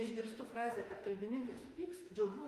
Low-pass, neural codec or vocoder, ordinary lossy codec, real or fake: 14.4 kHz; autoencoder, 48 kHz, 32 numbers a frame, DAC-VAE, trained on Japanese speech; Opus, 32 kbps; fake